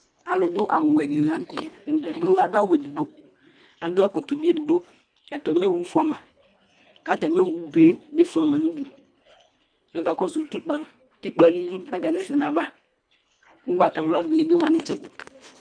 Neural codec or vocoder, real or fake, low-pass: codec, 24 kHz, 1.5 kbps, HILCodec; fake; 9.9 kHz